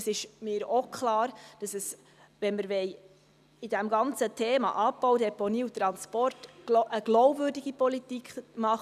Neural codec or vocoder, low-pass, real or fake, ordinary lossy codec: none; 14.4 kHz; real; none